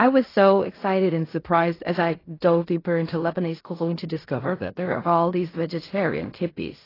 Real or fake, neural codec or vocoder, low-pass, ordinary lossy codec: fake; codec, 16 kHz in and 24 kHz out, 0.4 kbps, LongCat-Audio-Codec, fine tuned four codebook decoder; 5.4 kHz; AAC, 24 kbps